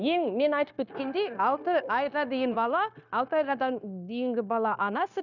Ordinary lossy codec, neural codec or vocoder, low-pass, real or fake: none; codec, 16 kHz, 0.9 kbps, LongCat-Audio-Codec; 7.2 kHz; fake